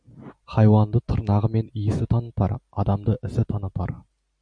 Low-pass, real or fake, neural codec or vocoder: 9.9 kHz; real; none